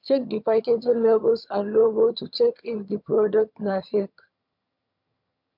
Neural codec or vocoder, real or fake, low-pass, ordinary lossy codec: vocoder, 22.05 kHz, 80 mel bands, HiFi-GAN; fake; 5.4 kHz; MP3, 48 kbps